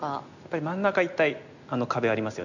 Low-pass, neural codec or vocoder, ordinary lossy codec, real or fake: 7.2 kHz; none; none; real